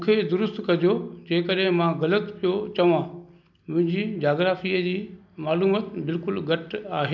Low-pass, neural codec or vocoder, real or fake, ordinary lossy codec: 7.2 kHz; none; real; none